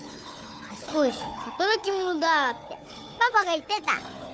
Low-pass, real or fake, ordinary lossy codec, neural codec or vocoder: none; fake; none; codec, 16 kHz, 4 kbps, FunCodec, trained on Chinese and English, 50 frames a second